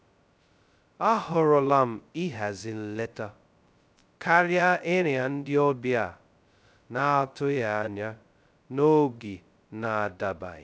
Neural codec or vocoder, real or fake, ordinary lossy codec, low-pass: codec, 16 kHz, 0.2 kbps, FocalCodec; fake; none; none